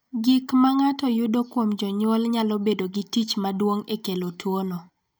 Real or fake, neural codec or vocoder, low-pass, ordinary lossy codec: real; none; none; none